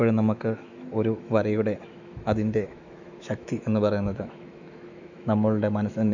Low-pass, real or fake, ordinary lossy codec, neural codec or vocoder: 7.2 kHz; fake; none; autoencoder, 48 kHz, 128 numbers a frame, DAC-VAE, trained on Japanese speech